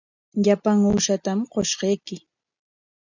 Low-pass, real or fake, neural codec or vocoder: 7.2 kHz; real; none